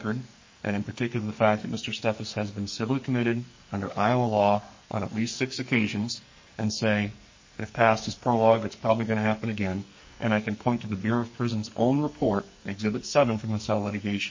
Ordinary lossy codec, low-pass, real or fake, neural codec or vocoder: MP3, 32 kbps; 7.2 kHz; fake; codec, 44.1 kHz, 2.6 kbps, SNAC